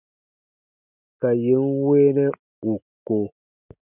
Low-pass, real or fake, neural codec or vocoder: 3.6 kHz; real; none